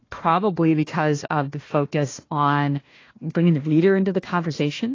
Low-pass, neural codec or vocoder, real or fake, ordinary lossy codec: 7.2 kHz; codec, 16 kHz, 1 kbps, FunCodec, trained on Chinese and English, 50 frames a second; fake; AAC, 32 kbps